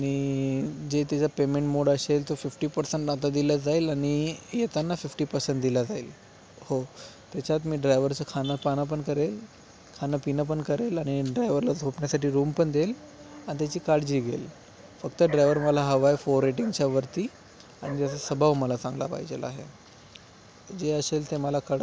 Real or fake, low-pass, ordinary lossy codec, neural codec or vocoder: real; none; none; none